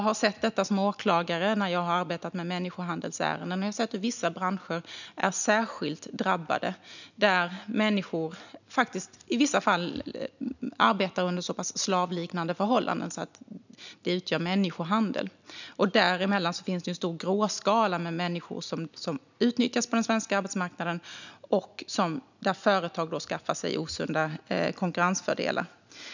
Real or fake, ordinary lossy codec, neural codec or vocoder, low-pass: real; none; none; 7.2 kHz